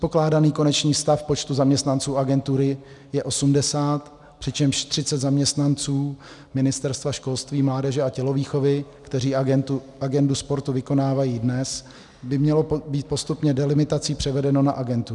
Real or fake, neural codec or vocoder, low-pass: real; none; 10.8 kHz